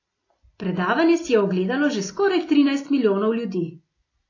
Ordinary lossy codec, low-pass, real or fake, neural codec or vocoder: AAC, 32 kbps; 7.2 kHz; real; none